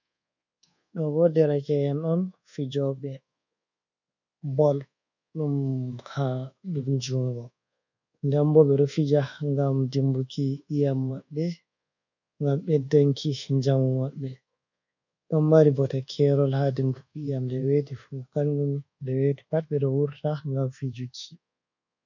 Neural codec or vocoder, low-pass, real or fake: codec, 24 kHz, 1.2 kbps, DualCodec; 7.2 kHz; fake